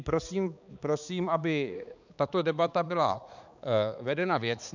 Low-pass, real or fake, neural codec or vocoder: 7.2 kHz; fake; codec, 16 kHz, 4 kbps, X-Codec, HuBERT features, trained on balanced general audio